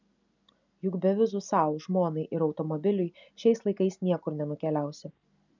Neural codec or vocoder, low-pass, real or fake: none; 7.2 kHz; real